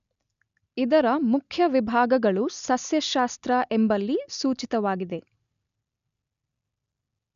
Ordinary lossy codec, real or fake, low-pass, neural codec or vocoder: none; real; 7.2 kHz; none